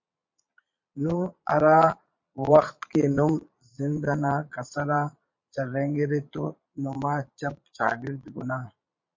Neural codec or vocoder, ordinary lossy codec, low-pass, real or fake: vocoder, 22.05 kHz, 80 mel bands, Vocos; MP3, 48 kbps; 7.2 kHz; fake